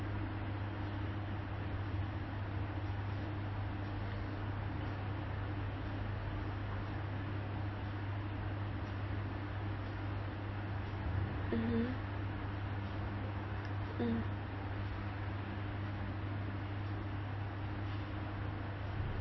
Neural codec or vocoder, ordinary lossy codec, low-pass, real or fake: none; MP3, 24 kbps; 7.2 kHz; real